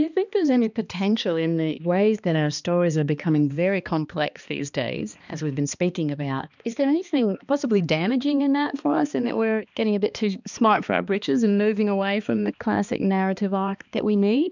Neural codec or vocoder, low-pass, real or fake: codec, 16 kHz, 2 kbps, X-Codec, HuBERT features, trained on balanced general audio; 7.2 kHz; fake